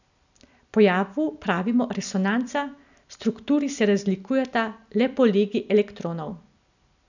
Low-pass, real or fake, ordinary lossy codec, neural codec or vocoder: 7.2 kHz; real; none; none